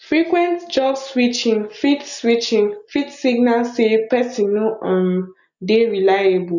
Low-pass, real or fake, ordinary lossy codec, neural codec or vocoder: 7.2 kHz; real; none; none